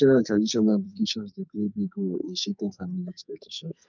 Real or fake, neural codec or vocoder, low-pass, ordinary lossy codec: fake; codec, 44.1 kHz, 2.6 kbps, SNAC; 7.2 kHz; none